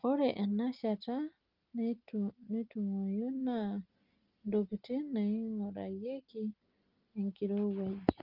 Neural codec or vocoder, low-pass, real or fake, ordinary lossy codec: none; 5.4 kHz; real; Opus, 24 kbps